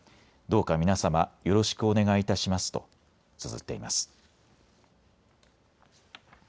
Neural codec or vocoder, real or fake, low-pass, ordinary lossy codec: none; real; none; none